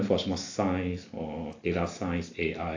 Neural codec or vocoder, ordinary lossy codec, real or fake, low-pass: none; AAC, 48 kbps; real; 7.2 kHz